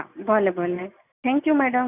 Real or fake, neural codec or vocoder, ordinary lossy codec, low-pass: real; none; none; 3.6 kHz